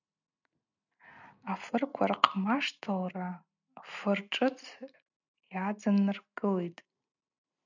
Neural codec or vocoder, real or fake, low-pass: none; real; 7.2 kHz